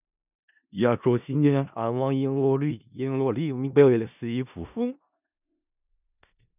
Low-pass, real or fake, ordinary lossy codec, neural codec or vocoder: 3.6 kHz; fake; none; codec, 16 kHz in and 24 kHz out, 0.4 kbps, LongCat-Audio-Codec, four codebook decoder